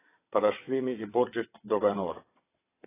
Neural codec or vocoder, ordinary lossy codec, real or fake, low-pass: vocoder, 22.05 kHz, 80 mel bands, WaveNeXt; AAC, 16 kbps; fake; 3.6 kHz